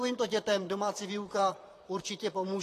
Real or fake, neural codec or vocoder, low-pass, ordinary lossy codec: fake; vocoder, 44.1 kHz, 128 mel bands, Pupu-Vocoder; 14.4 kHz; AAC, 48 kbps